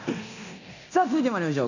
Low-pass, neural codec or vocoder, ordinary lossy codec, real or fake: 7.2 kHz; codec, 24 kHz, 0.9 kbps, DualCodec; none; fake